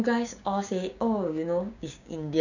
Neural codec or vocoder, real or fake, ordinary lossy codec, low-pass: codec, 16 kHz, 6 kbps, DAC; fake; none; 7.2 kHz